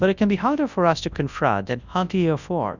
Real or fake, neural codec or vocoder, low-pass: fake; codec, 24 kHz, 0.9 kbps, WavTokenizer, large speech release; 7.2 kHz